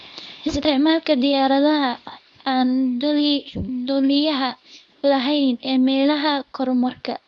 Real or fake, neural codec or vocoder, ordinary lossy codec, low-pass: fake; codec, 24 kHz, 0.9 kbps, WavTokenizer, small release; none; none